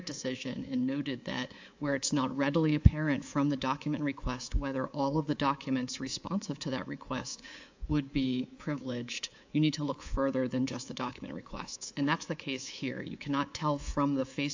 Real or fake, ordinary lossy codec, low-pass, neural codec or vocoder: fake; AAC, 48 kbps; 7.2 kHz; codec, 24 kHz, 3.1 kbps, DualCodec